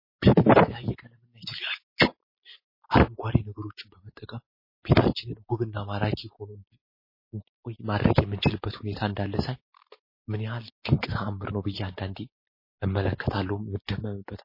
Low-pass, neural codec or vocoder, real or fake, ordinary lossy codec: 5.4 kHz; none; real; MP3, 24 kbps